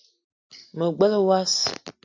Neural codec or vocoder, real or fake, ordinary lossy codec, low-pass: none; real; AAC, 48 kbps; 7.2 kHz